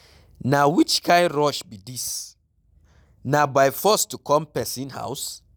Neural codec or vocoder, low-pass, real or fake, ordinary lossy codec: none; none; real; none